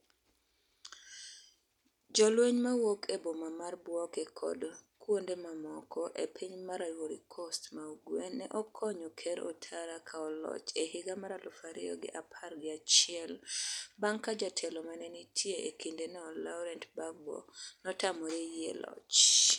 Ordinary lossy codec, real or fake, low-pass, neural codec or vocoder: none; real; 19.8 kHz; none